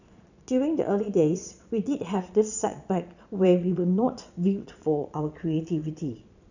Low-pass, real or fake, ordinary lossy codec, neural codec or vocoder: 7.2 kHz; fake; none; vocoder, 22.05 kHz, 80 mel bands, Vocos